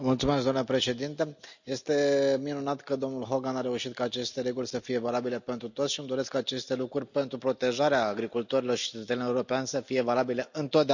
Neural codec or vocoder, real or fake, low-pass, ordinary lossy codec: none; real; 7.2 kHz; none